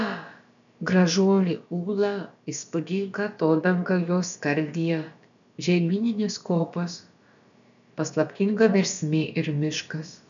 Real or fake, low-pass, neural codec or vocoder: fake; 7.2 kHz; codec, 16 kHz, about 1 kbps, DyCAST, with the encoder's durations